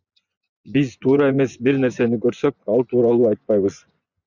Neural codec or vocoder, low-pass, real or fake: vocoder, 24 kHz, 100 mel bands, Vocos; 7.2 kHz; fake